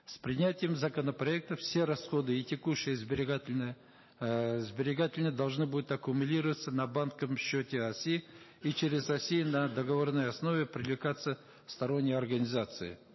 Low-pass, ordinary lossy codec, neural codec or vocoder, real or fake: 7.2 kHz; MP3, 24 kbps; none; real